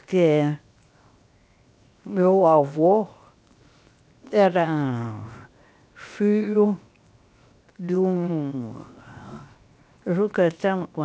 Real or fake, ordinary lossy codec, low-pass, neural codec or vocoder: fake; none; none; codec, 16 kHz, 0.7 kbps, FocalCodec